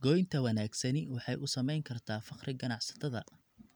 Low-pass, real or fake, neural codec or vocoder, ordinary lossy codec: none; real; none; none